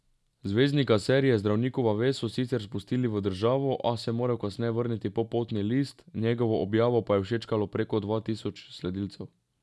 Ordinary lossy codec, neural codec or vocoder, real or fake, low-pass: none; none; real; none